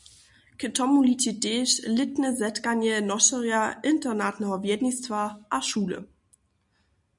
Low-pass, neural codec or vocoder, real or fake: 10.8 kHz; none; real